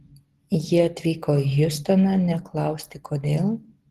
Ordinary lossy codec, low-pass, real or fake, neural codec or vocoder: Opus, 16 kbps; 14.4 kHz; real; none